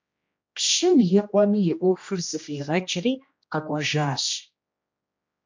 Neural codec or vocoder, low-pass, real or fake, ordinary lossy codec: codec, 16 kHz, 1 kbps, X-Codec, HuBERT features, trained on general audio; 7.2 kHz; fake; MP3, 48 kbps